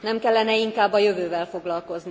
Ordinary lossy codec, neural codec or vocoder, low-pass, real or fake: none; none; none; real